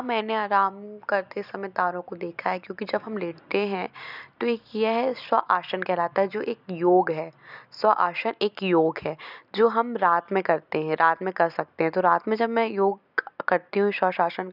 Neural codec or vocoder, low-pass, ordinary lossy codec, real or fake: none; 5.4 kHz; none; real